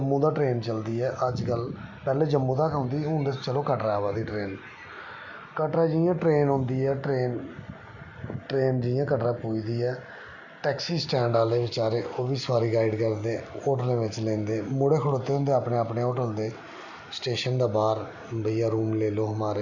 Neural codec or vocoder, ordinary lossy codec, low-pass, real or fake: none; none; 7.2 kHz; real